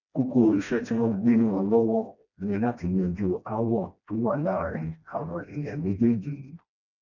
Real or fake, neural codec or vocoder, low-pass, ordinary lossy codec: fake; codec, 16 kHz, 1 kbps, FreqCodec, smaller model; 7.2 kHz; none